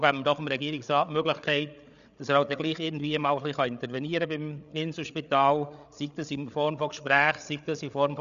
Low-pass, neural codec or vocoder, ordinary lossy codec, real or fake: 7.2 kHz; codec, 16 kHz, 8 kbps, FreqCodec, larger model; none; fake